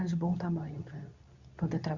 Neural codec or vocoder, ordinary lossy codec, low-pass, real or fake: codec, 24 kHz, 0.9 kbps, WavTokenizer, medium speech release version 2; Opus, 64 kbps; 7.2 kHz; fake